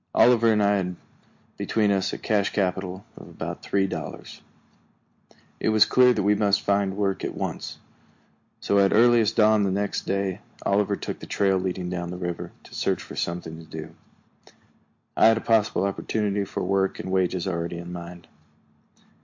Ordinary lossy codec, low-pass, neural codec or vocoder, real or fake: MP3, 48 kbps; 7.2 kHz; none; real